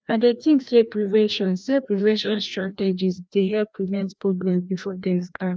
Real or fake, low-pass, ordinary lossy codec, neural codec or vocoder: fake; none; none; codec, 16 kHz, 1 kbps, FreqCodec, larger model